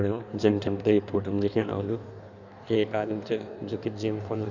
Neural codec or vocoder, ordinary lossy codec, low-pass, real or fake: codec, 16 kHz in and 24 kHz out, 1.1 kbps, FireRedTTS-2 codec; none; 7.2 kHz; fake